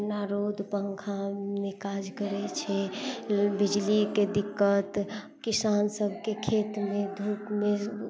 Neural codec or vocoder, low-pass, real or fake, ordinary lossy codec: none; none; real; none